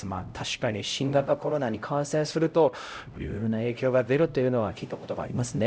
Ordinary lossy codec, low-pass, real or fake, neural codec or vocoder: none; none; fake; codec, 16 kHz, 0.5 kbps, X-Codec, HuBERT features, trained on LibriSpeech